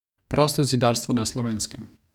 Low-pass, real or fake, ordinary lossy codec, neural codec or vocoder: 19.8 kHz; fake; none; codec, 44.1 kHz, 2.6 kbps, DAC